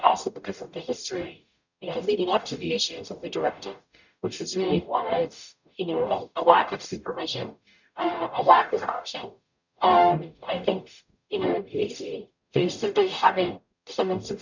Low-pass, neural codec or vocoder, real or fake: 7.2 kHz; codec, 44.1 kHz, 0.9 kbps, DAC; fake